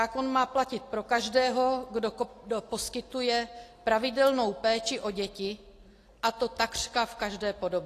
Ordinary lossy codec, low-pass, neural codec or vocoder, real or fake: AAC, 48 kbps; 14.4 kHz; none; real